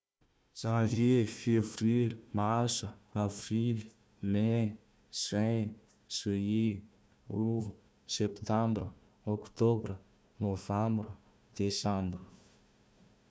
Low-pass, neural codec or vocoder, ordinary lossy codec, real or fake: none; codec, 16 kHz, 1 kbps, FunCodec, trained on Chinese and English, 50 frames a second; none; fake